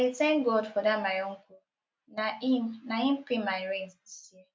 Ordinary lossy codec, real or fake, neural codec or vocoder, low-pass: none; real; none; none